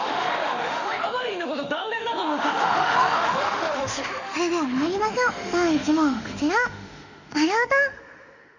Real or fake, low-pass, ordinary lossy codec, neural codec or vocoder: fake; 7.2 kHz; none; autoencoder, 48 kHz, 32 numbers a frame, DAC-VAE, trained on Japanese speech